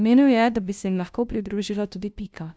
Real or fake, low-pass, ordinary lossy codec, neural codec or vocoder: fake; none; none; codec, 16 kHz, 0.5 kbps, FunCodec, trained on LibriTTS, 25 frames a second